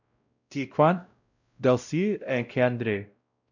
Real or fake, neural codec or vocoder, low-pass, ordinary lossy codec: fake; codec, 16 kHz, 0.5 kbps, X-Codec, WavLM features, trained on Multilingual LibriSpeech; 7.2 kHz; none